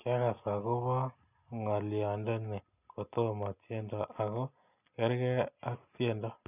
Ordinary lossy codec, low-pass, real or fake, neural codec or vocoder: none; 3.6 kHz; real; none